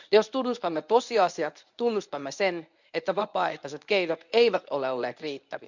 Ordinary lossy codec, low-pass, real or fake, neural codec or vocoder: none; 7.2 kHz; fake; codec, 24 kHz, 0.9 kbps, WavTokenizer, medium speech release version 1